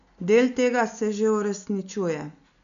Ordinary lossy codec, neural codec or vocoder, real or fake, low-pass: none; none; real; 7.2 kHz